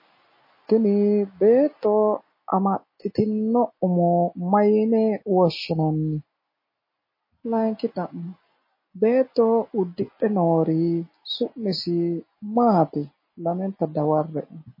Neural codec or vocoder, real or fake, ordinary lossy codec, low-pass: none; real; MP3, 24 kbps; 5.4 kHz